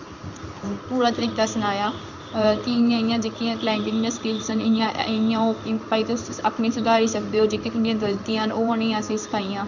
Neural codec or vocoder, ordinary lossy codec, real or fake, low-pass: codec, 16 kHz in and 24 kHz out, 1 kbps, XY-Tokenizer; none; fake; 7.2 kHz